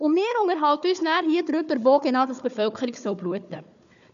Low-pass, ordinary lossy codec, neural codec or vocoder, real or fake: 7.2 kHz; none; codec, 16 kHz, 4 kbps, FunCodec, trained on Chinese and English, 50 frames a second; fake